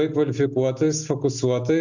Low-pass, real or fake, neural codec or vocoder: 7.2 kHz; real; none